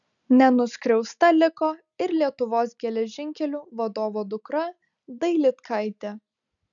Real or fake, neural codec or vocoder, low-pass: real; none; 7.2 kHz